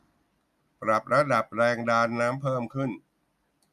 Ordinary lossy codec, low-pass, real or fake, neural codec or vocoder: none; 14.4 kHz; real; none